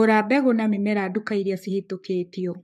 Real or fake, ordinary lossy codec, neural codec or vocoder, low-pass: fake; MP3, 64 kbps; codec, 44.1 kHz, 7.8 kbps, Pupu-Codec; 14.4 kHz